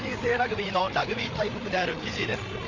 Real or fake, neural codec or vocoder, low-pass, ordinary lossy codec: fake; codec, 16 kHz, 8 kbps, FreqCodec, larger model; 7.2 kHz; none